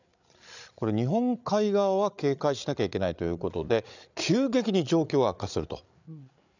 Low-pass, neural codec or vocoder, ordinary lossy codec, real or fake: 7.2 kHz; codec, 16 kHz, 8 kbps, FreqCodec, larger model; none; fake